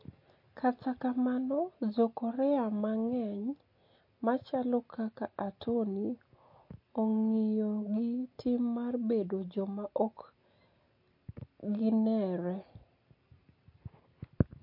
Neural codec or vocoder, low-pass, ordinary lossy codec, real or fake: none; 5.4 kHz; MP3, 32 kbps; real